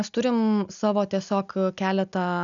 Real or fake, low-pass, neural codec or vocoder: real; 7.2 kHz; none